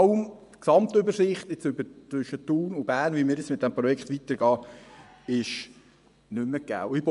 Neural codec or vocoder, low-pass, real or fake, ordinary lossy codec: vocoder, 24 kHz, 100 mel bands, Vocos; 10.8 kHz; fake; none